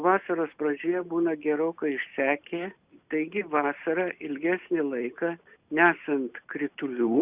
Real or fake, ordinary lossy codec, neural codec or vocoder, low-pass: real; Opus, 64 kbps; none; 3.6 kHz